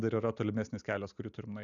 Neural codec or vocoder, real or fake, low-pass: none; real; 7.2 kHz